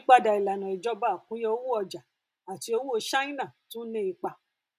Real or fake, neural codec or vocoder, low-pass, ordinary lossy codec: real; none; 14.4 kHz; MP3, 96 kbps